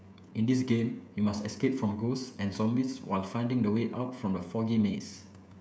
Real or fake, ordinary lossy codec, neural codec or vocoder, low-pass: fake; none; codec, 16 kHz, 16 kbps, FreqCodec, smaller model; none